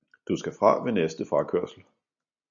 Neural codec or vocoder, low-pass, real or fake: none; 7.2 kHz; real